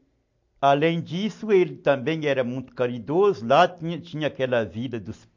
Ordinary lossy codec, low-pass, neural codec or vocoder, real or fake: MP3, 48 kbps; 7.2 kHz; none; real